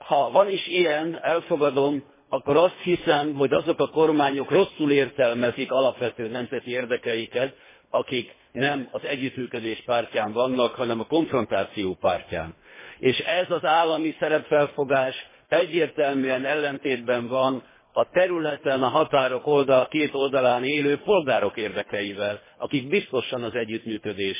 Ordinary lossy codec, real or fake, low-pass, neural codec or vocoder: MP3, 16 kbps; fake; 3.6 kHz; codec, 24 kHz, 3 kbps, HILCodec